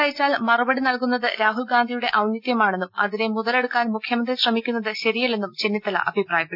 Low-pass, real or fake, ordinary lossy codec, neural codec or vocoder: 5.4 kHz; real; none; none